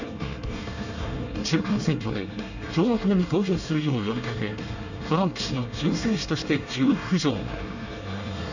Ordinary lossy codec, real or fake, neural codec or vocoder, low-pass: none; fake; codec, 24 kHz, 1 kbps, SNAC; 7.2 kHz